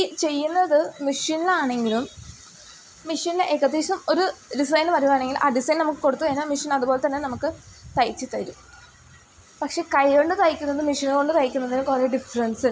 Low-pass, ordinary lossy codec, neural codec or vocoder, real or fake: none; none; none; real